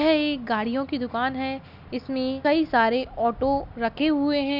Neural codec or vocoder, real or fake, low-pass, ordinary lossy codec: none; real; 5.4 kHz; none